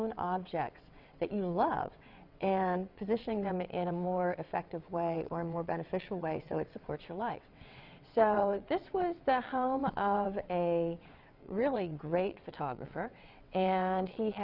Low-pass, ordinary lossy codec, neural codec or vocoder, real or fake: 5.4 kHz; Opus, 64 kbps; vocoder, 22.05 kHz, 80 mel bands, WaveNeXt; fake